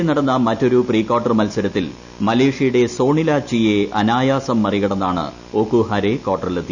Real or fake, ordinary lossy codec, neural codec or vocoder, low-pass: real; none; none; 7.2 kHz